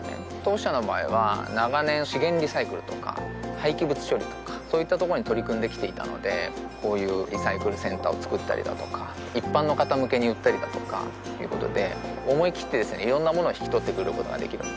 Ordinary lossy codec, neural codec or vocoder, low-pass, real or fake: none; none; none; real